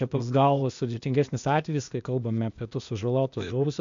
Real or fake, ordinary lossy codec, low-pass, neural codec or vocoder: fake; MP3, 64 kbps; 7.2 kHz; codec, 16 kHz, 0.8 kbps, ZipCodec